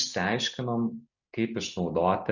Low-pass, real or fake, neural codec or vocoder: 7.2 kHz; real; none